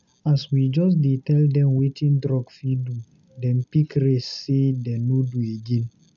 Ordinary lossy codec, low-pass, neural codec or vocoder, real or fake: none; 7.2 kHz; none; real